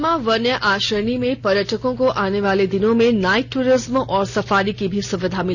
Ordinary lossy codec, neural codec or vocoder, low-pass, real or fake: none; none; none; real